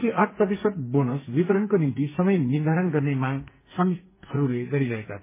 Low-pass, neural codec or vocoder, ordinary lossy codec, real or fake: 3.6 kHz; codec, 44.1 kHz, 2.6 kbps, SNAC; MP3, 16 kbps; fake